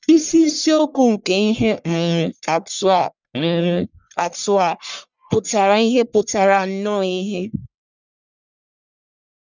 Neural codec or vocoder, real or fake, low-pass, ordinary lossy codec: codec, 44.1 kHz, 1.7 kbps, Pupu-Codec; fake; 7.2 kHz; none